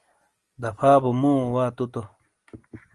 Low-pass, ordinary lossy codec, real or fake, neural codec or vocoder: 10.8 kHz; Opus, 24 kbps; real; none